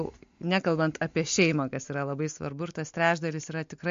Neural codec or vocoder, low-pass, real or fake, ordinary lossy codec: none; 7.2 kHz; real; MP3, 48 kbps